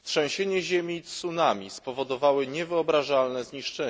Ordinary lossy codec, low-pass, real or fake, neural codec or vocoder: none; none; real; none